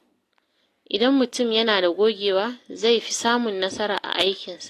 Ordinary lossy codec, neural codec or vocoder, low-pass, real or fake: AAC, 48 kbps; none; 14.4 kHz; real